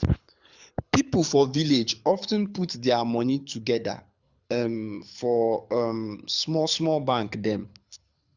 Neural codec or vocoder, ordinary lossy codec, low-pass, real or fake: codec, 24 kHz, 6 kbps, HILCodec; Opus, 64 kbps; 7.2 kHz; fake